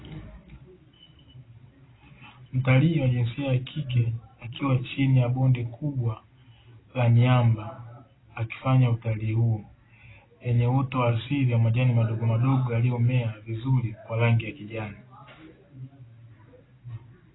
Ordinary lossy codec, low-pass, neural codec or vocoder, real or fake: AAC, 16 kbps; 7.2 kHz; none; real